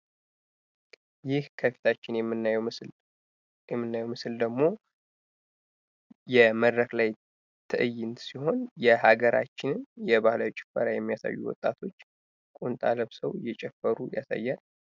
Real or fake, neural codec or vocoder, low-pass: real; none; 7.2 kHz